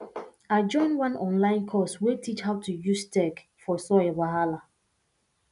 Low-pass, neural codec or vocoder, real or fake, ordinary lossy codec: 10.8 kHz; none; real; none